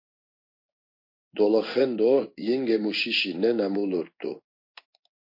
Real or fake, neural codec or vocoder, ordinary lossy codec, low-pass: fake; codec, 16 kHz in and 24 kHz out, 1 kbps, XY-Tokenizer; MP3, 32 kbps; 5.4 kHz